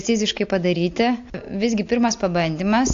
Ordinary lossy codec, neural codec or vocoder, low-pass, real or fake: AAC, 48 kbps; none; 7.2 kHz; real